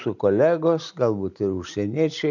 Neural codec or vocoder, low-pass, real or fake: none; 7.2 kHz; real